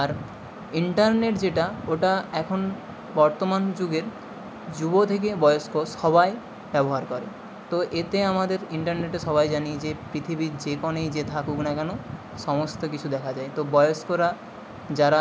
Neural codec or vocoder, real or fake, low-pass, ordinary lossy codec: none; real; none; none